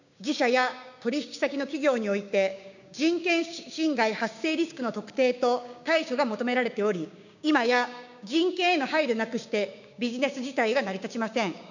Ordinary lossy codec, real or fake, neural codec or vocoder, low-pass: none; fake; codec, 16 kHz, 6 kbps, DAC; 7.2 kHz